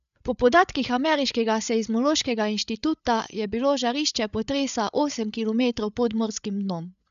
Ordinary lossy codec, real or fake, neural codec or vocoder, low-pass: none; fake; codec, 16 kHz, 8 kbps, FreqCodec, larger model; 7.2 kHz